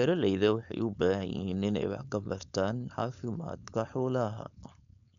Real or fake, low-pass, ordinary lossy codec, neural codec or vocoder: fake; 7.2 kHz; none; codec, 16 kHz, 4.8 kbps, FACodec